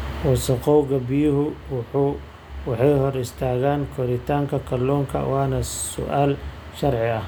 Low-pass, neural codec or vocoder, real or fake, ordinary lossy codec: none; none; real; none